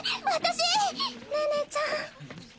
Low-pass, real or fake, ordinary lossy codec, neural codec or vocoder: none; real; none; none